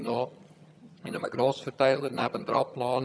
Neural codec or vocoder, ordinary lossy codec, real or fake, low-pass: vocoder, 22.05 kHz, 80 mel bands, HiFi-GAN; none; fake; none